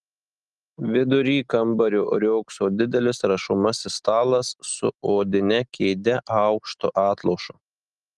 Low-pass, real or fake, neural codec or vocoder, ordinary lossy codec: 10.8 kHz; real; none; Opus, 24 kbps